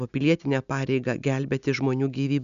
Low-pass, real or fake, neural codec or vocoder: 7.2 kHz; real; none